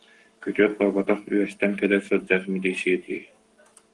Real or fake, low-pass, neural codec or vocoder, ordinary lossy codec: fake; 10.8 kHz; codec, 44.1 kHz, 7.8 kbps, Pupu-Codec; Opus, 24 kbps